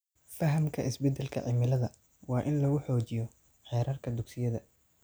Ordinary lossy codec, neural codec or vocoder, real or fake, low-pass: none; none; real; none